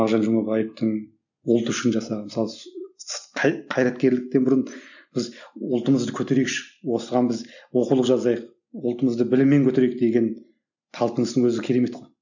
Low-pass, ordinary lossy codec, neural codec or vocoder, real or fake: 7.2 kHz; none; none; real